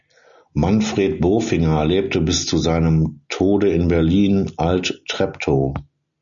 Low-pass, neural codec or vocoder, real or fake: 7.2 kHz; none; real